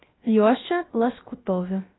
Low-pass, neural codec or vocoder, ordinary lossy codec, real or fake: 7.2 kHz; codec, 16 kHz, 0.3 kbps, FocalCodec; AAC, 16 kbps; fake